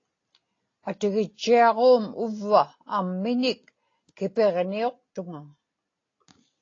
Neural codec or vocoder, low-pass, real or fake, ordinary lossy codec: none; 7.2 kHz; real; AAC, 32 kbps